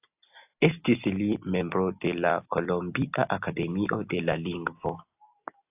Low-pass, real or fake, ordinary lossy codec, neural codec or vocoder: 3.6 kHz; real; AAC, 32 kbps; none